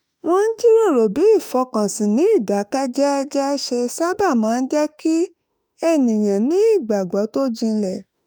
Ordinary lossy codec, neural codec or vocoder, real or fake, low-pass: none; autoencoder, 48 kHz, 32 numbers a frame, DAC-VAE, trained on Japanese speech; fake; none